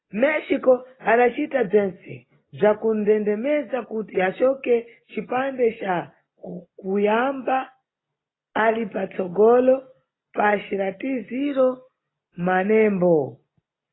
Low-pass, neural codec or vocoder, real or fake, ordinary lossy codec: 7.2 kHz; none; real; AAC, 16 kbps